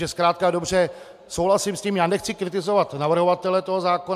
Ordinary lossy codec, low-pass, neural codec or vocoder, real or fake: AAC, 96 kbps; 14.4 kHz; none; real